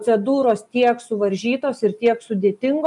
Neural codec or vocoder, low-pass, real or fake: none; 10.8 kHz; real